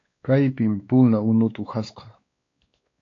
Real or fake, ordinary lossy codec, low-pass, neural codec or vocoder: fake; AAC, 48 kbps; 7.2 kHz; codec, 16 kHz, 2 kbps, X-Codec, WavLM features, trained on Multilingual LibriSpeech